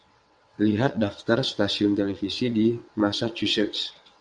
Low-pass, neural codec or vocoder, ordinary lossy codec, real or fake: 9.9 kHz; vocoder, 22.05 kHz, 80 mel bands, WaveNeXt; MP3, 96 kbps; fake